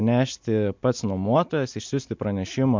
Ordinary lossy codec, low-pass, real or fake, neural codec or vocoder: MP3, 64 kbps; 7.2 kHz; real; none